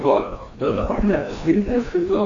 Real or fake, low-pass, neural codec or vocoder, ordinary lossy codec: fake; 7.2 kHz; codec, 16 kHz, 1 kbps, FreqCodec, larger model; AAC, 48 kbps